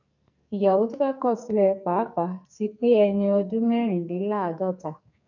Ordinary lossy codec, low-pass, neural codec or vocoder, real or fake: none; 7.2 kHz; codec, 44.1 kHz, 2.6 kbps, SNAC; fake